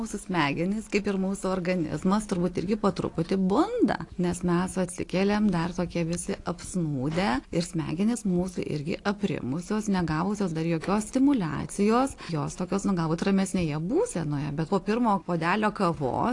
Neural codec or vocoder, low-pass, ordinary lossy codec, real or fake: none; 10.8 kHz; AAC, 48 kbps; real